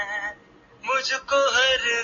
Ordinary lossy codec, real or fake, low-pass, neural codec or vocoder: MP3, 48 kbps; real; 7.2 kHz; none